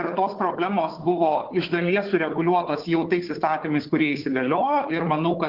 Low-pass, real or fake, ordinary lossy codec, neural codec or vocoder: 5.4 kHz; fake; Opus, 16 kbps; codec, 16 kHz, 4 kbps, FunCodec, trained on Chinese and English, 50 frames a second